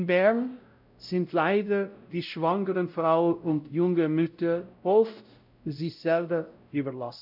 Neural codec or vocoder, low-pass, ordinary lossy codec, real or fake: codec, 16 kHz, 0.5 kbps, X-Codec, WavLM features, trained on Multilingual LibriSpeech; 5.4 kHz; none; fake